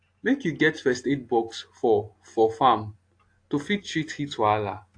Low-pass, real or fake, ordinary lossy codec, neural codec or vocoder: 9.9 kHz; real; AAC, 48 kbps; none